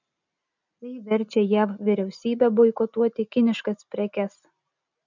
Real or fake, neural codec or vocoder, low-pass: real; none; 7.2 kHz